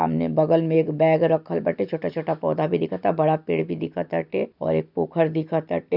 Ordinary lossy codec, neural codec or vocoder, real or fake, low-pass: none; none; real; 5.4 kHz